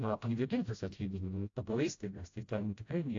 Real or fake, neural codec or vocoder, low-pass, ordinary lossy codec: fake; codec, 16 kHz, 0.5 kbps, FreqCodec, smaller model; 7.2 kHz; AAC, 48 kbps